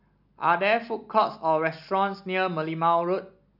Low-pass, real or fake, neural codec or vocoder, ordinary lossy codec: 5.4 kHz; real; none; none